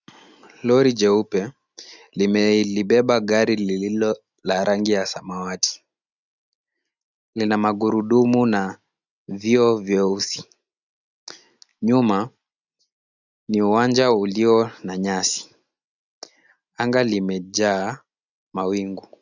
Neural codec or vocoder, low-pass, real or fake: none; 7.2 kHz; real